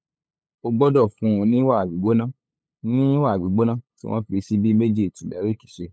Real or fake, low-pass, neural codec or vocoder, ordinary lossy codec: fake; none; codec, 16 kHz, 8 kbps, FunCodec, trained on LibriTTS, 25 frames a second; none